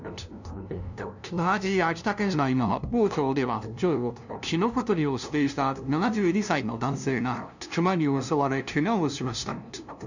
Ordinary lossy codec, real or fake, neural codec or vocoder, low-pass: none; fake; codec, 16 kHz, 0.5 kbps, FunCodec, trained on LibriTTS, 25 frames a second; 7.2 kHz